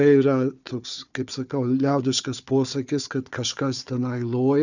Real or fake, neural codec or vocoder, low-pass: fake; codec, 16 kHz, 4 kbps, FunCodec, trained on Chinese and English, 50 frames a second; 7.2 kHz